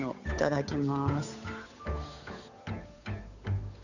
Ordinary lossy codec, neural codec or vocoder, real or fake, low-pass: none; codec, 16 kHz, 8 kbps, FunCodec, trained on Chinese and English, 25 frames a second; fake; 7.2 kHz